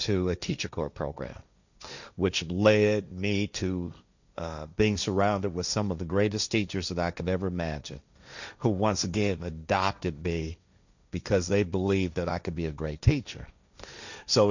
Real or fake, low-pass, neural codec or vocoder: fake; 7.2 kHz; codec, 16 kHz, 1.1 kbps, Voila-Tokenizer